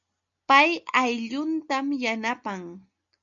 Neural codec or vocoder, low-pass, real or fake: none; 7.2 kHz; real